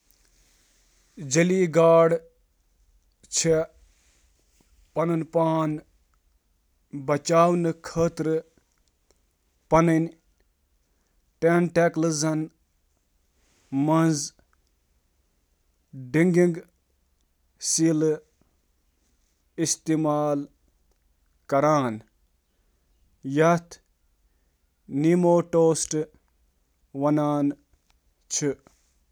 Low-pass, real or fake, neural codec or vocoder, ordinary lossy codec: none; real; none; none